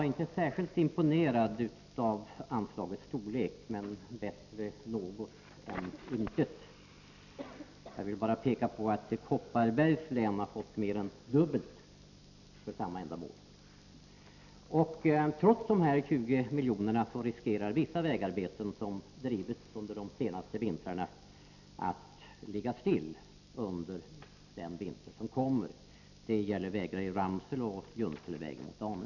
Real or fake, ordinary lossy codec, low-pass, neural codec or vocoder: real; none; 7.2 kHz; none